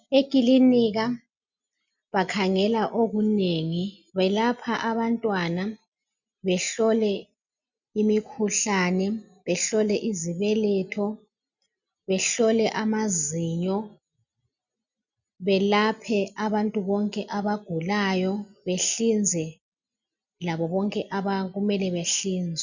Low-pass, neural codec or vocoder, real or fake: 7.2 kHz; none; real